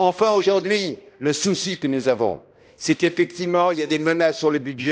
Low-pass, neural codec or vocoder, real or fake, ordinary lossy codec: none; codec, 16 kHz, 1 kbps, X-Codec, HuBERT features, trained on balanced general audio; fake; none